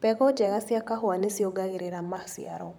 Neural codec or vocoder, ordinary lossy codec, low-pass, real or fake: vocoder, 44.1 kHz, 128 mel bands, Pupu-Vocoder; none; none; fake